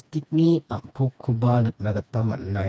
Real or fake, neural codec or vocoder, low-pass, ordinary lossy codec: fake; codec, 16 kHz, 2 kbps, FreqCodec, smaller model; none; none